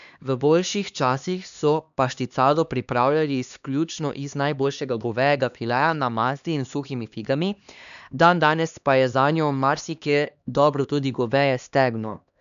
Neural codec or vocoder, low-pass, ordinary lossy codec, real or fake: codec, 16 kHz, 2 kbps, X-Codec, HuBERT features, trained on LibriSpeech; 7.2 kHz; none; fake